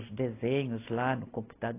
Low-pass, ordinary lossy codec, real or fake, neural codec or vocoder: 3.6 kHz; AAC, 24 kbps; real; none